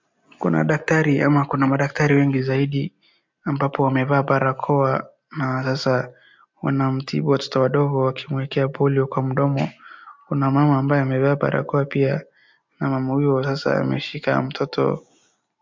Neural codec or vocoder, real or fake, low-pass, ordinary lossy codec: none; real; 7.2 kHz; MP3, 64 kbps